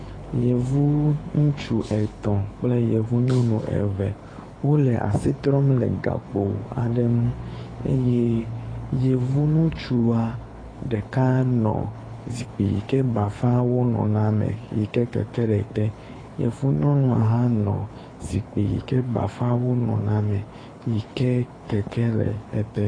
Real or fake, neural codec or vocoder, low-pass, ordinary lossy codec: fake; codec, 24 kHz, 6 kbps, HILCodec; 9.9 kHz; AAC, 32 kbps